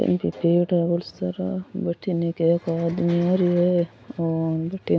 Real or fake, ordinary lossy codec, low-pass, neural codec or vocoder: real; none; none; none